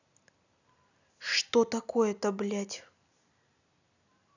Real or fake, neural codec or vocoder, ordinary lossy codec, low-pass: real; none; none; 7.2 kHz